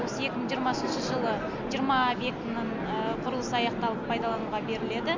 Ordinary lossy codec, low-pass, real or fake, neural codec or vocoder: MP3, 64 kbps; 7.2 kHz; real; none